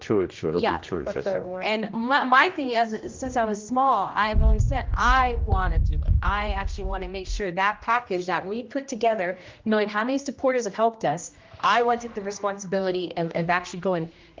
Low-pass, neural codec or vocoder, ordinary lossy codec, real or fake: 7.2 kHz; codec, 16 kHz, 1 kbps, X-Codec, HuBERT features, trained on general audio; Opus, 24 kbps; fake